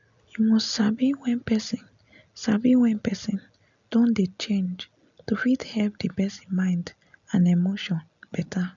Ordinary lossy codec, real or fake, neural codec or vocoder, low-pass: none; real; none; 7.2 kHz